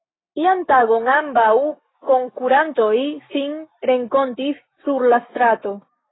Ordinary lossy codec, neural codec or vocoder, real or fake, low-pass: AAC, 16 kbps; autoencoder, 48 kHz, 128 numbers a frame, DAC-VAE, trained on Japanese speech; fake; 7.2 kHz